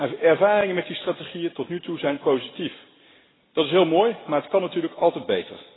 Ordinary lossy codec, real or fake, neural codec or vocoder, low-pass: AAC, 16 kbps; real; none; 7.2 kHz